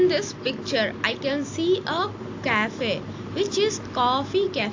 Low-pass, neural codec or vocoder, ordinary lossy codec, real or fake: 7.2 kHz; none; AAC, 48 kbps; real